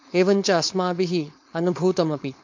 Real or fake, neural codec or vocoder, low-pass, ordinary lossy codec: fake; codec, 16 kHz, 4.8 kbps, FACodec; 7.2 kHz; MP3, 48 kbps